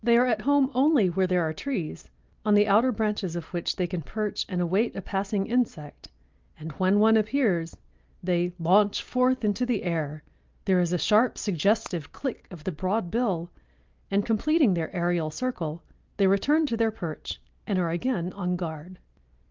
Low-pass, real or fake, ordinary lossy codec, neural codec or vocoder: 7.2 kHz; real; Opus, 24 kbps; none